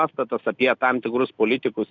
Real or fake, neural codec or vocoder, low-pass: real; none; 7.2 kHz